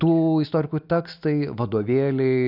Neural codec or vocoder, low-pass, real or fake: none; 5.4 kHz; real